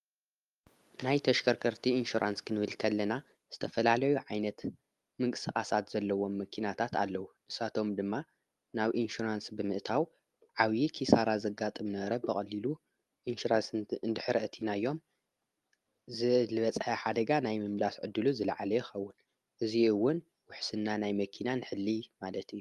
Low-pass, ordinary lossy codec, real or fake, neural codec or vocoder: 14.4 kHz; Opus, 32 kbps; real; none